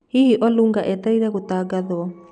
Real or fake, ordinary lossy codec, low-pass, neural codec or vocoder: real; none; 9.9 kHz; none